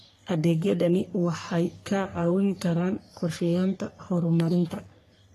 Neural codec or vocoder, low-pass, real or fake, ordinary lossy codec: codec, 44.1 kHz, 3.4 kbps, Pupu-Codec; 14.4 kHz; fake; AAC, 48 kbps